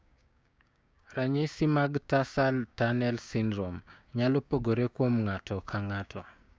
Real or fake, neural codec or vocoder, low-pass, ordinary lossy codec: fake; codec, 16 kHz, 6 kbps, DAC; none; none